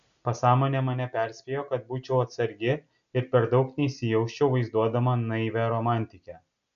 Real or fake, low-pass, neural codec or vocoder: real; 7.2 kHz; none